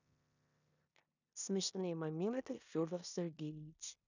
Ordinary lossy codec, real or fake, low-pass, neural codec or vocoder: none; fake; 7.2 kHz; codec, 16 kHz in and 24 kHz out, 0.9 kbps, LongCat-Audio-Codec, four codebook decoder